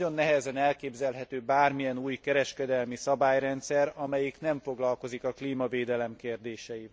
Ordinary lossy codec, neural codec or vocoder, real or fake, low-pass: none; none; real; none